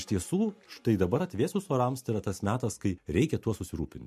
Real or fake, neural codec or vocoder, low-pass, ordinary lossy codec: real; none; 14.4 kHz; MP3, 64 kbps